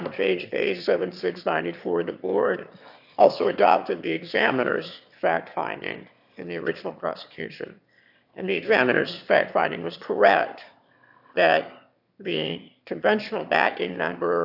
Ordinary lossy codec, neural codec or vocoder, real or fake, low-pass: AAC, 48 kbps; autoencoder, 22.05 kHz, a latent of 192 numbers a frame, VITS, trained on one speaker; fake; 5.4 kHz